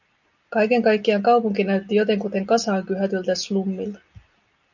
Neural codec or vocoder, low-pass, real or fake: none; 7.2 kHz; real